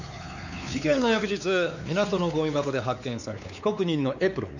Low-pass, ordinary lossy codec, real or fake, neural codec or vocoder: 7.2 kHz; none; fake; codec, 16 kHz, 4 kbps, X-Codec, WavLM features, trained on Multilingual LibriSpeech